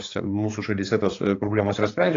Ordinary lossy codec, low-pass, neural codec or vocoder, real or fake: AAC, 32 kbps; 7.2 kHz; codec, 16 kHz, 4 kbps, X-Codec, HuBERT features, trained on balanced general audio; fake